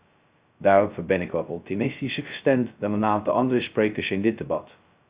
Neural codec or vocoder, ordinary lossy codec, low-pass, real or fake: codec, 16 kHz, 0.2 kbps, FocalCodec; Opus, 64 kbps; 3.6 kHz; fake